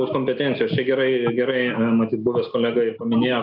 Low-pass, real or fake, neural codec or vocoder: 5.4 kHz; real; none